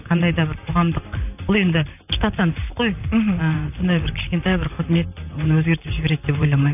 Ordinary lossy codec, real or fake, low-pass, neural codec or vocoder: none; fake; 3.6 kHz; vocoder, 44.1 kHz, 128 mel bands, Pupu-Vocoder